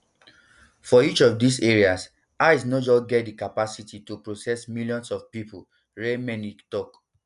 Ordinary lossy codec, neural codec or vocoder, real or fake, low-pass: none; none; real; 10.8 kHz